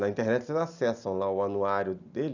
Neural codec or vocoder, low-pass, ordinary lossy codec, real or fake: none; 7.2 kHz; none; real